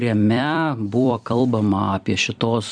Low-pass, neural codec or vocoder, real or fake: 9.9 kHz; vocoder, 44.1 kHz, 128 mel bands every 256 samples, BigVGAN v2; fake